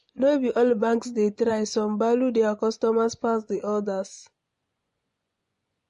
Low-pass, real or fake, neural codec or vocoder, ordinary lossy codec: 10.8 kHz; fake; vocoder, 24 kHz, 100 mel bands, Vocos; MP3, 48 kbps